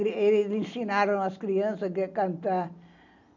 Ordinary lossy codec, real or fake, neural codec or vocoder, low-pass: none; real; none; 7.2 kHz